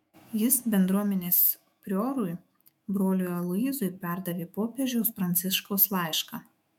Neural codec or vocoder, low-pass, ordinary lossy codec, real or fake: autoencoder, 48 kHz, 128 numbers a frame, DAC-VAE, trained on Japanese speech; 19.8 kHz; MP3, 96 kbps; fake